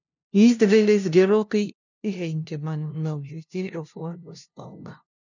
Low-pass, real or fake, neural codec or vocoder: 7.2 kHz; fake; codec, 16 kHz, 0.5 kbps, FunCodec, trained on LibriTTS, 25 frames a second